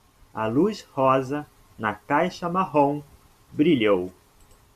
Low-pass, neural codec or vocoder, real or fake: 14.4 kHz; none; real